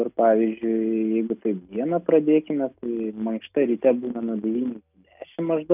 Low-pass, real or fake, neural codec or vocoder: 3.6 kHz; real; none